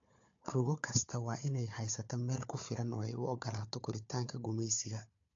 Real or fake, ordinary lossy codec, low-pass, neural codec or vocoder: fake; AAC, 48 kbps; 7.2 kHz; codec, 16 kHz, 4 kbps, FunCodec, trained on Chinese and English, 50 frames a second